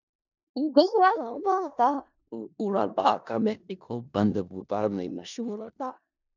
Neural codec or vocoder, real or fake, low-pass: codec, 16 kHz in and 24 kHz out, 0.4 kbps, LongCat-Audio-Codec, four codebook decoder; fake; 7.2 kHz